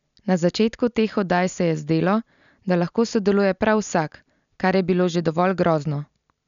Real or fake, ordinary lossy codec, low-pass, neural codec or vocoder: real; none; 7.2 kHz; none